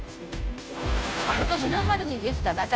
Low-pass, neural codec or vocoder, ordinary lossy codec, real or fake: none; codec, 16 kHz, 0.5 kbps, FunCodec, trained on Chinese and English, 25 frames a second; none; fake